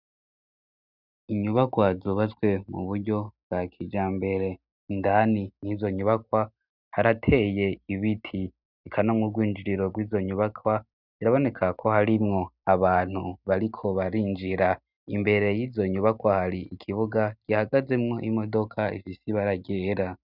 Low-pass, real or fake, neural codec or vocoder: 5.4 kHz; real; none